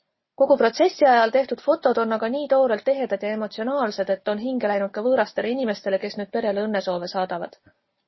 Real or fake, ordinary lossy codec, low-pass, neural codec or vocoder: real; MP3, 24 kbps; 7.2 kHz; none